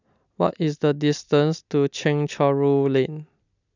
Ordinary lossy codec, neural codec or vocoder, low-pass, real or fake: none; none; 7.2 kHz; real